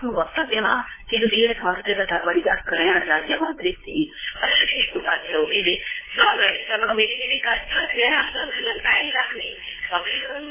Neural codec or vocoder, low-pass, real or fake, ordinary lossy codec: codec, 16 kHz, 2 kbps, FunCodec, trained on LibriTTS, 25 frames a second; 3.6 kHz; fake; MP3, 16 kbps